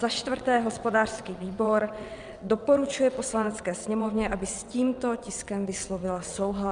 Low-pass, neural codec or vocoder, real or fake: 9.9 kHz; vocoder, 22.05 kHz, 80 mel bands, WaveNeXt; fake